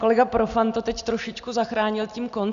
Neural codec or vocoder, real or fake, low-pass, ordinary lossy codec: none; real; 7.2 kHz; Opus, 64 kbps